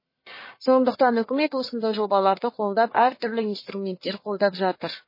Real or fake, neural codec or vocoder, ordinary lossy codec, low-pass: fake; codec, 44.1 kHz, 1.7 kbps, Pupu-Codec; MP3, 24 kbps; 5.4 kHz